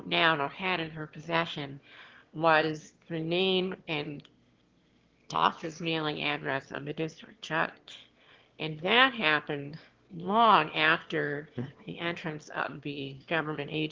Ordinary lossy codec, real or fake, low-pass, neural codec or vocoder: Opus, 16 kbps; fake; 7.2 kHz; autoencoder, 22.05 kHz, a latent of 192 numbers a frame, VITS, trained on one speaker